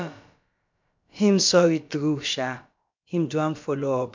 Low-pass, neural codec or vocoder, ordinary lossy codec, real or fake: 7.2 kHz; codec, 16 kHz, about 1 kbps, DyCAST, with the encoder's durations; MP3, 64 kbps; fake